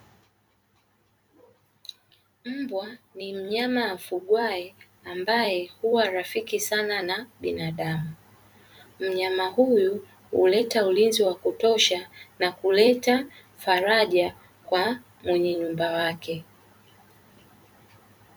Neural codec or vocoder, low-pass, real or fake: vocoder, 44.1 kHz, 128 mel bands every 256 samples, BigVGAN v2; 19.8 kHz; fake